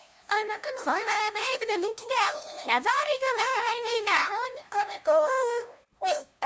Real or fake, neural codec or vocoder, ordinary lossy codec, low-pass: fake; codec, 16 kHz, 0.5 kbps, FunCodec, trained on LibriTTS, 25 frames a second; none; none